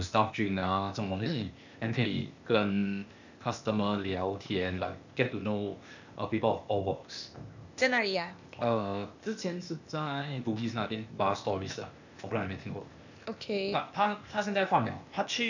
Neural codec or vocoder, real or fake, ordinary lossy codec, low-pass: codec, 16 kHz, 0.8 kbps, ZipCodec; fake; none; 7.2 kHz